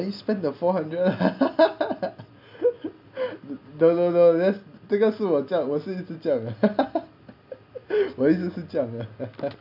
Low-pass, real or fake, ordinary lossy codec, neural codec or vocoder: 5.4 kHz; real; none; none